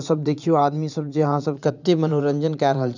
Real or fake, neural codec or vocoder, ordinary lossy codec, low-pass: fake; vocoder, 44.1 kHz, 80 mel bands, Vocos; none; 7.2 kHz